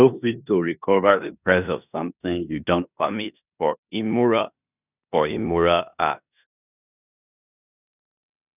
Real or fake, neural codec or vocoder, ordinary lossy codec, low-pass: fake; codec, 16 kHz in and 24 kHz out, 0.9 kbps, LongCat-Audio-Codec, four codebook decoder; none; 3.6 kHz